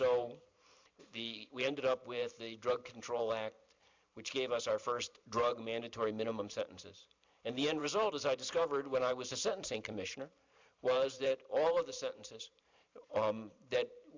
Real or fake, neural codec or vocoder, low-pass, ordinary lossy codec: fake; vocoder, 44.1 kHz, 128 mel bands every 512 samples, BigVGAN v2; 7.2 kHz; MP3, 64 kbps